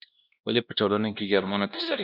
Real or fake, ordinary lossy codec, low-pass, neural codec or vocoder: fake; Opus, 64 kbps; 5.4 kHz; codec, 16 kHz, 1 kbps, X-Codec, WavLM features, trained on Multilingual LibriSpeech